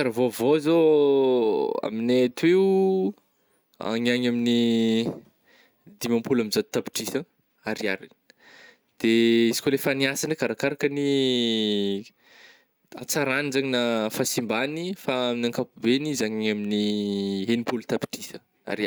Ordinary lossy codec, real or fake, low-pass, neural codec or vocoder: none; real; none; none